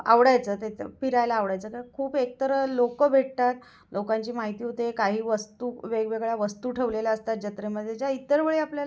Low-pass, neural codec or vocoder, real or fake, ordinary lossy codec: none; none; real; none